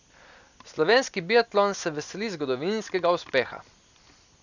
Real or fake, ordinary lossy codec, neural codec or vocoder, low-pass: real; none; none; 7.2 kHz